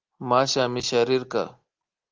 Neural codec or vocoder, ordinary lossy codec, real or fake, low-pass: none; Opus, 16 kbps; real; 7.2 kHz